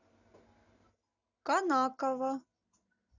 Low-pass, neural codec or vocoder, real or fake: 7.2 kHz; none; real